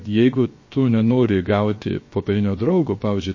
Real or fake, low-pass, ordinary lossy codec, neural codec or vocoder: fake; 7.2 kHz; MP3, 32 kbps; codec, 16 kHz, 0.7 kbps, FocalCodec